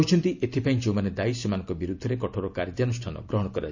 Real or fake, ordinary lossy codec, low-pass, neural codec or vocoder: real; none; 7.2 kHz; none